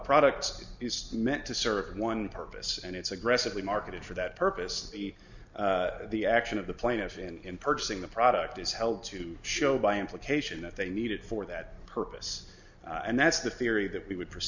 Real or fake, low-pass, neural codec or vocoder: real; 7.2 kHz; none